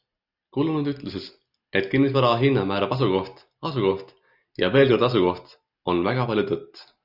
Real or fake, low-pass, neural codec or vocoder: real; 5.4 kHz; none